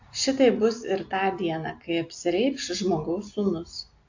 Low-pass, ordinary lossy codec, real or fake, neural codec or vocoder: 7.2 kHz; AAC, 48 kbps; real; none